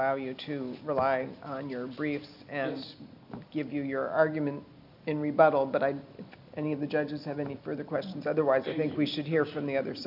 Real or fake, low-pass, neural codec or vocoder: real; 5.4 kHz; none